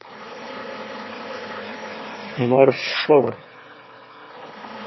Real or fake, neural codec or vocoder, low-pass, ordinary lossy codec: fake; autoencoder, 22.05 kHz, a latent of 192 numbers a frame, VITS, trained on one speaker; 7.2 kHz; MP3, 24 kbps